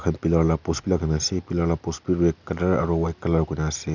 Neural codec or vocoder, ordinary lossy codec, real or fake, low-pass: none; none; real; 7.2 kHz